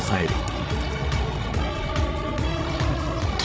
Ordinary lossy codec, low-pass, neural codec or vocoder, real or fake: none; none; codec, 16 kHz, 16 kbps, FreqCodec, larger model; fake